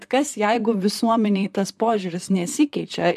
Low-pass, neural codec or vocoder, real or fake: 14.4 kHz; vocoder, 44.1 kHz, 128 mel bands, Pupu-Vocoder; fake